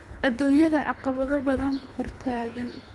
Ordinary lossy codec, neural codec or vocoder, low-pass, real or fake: none; codec, 24 kHz, 3 kbps, HILCodec; none; fake